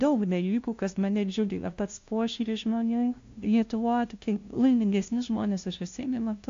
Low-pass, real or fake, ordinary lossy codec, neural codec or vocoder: 7.2 kHz; fake; AAC, 48 kbps; codec, 16 kHz, 0.5 kbps, FunCodec, trained on LibriTTS, 25 frames a second